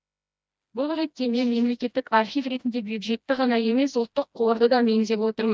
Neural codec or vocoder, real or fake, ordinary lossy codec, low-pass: codec, 16 kHz, 1 kbps, FreqCodec, smaller model; fake; none; none